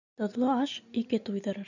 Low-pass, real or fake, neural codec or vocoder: 7.2 kHz; real; none